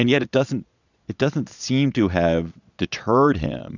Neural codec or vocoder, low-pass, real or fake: vocoder, 22.05 kHz, 80 mel bands, Vocos; 7.2 kHz; fake